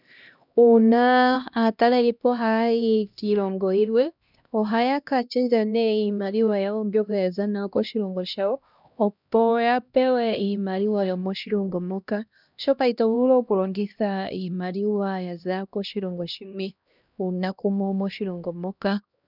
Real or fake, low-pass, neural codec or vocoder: fake; 5.4 kHz; codec, 16 kHz, 1 kbps, X-Codec, HuBERT features, trained on LibriSpeech